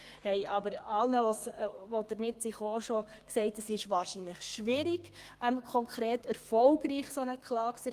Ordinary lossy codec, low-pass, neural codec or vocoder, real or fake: Opus, 32 kbps; 14.4 kHz; codec, 32 kHz, 1.9 kbps, SNAC; fake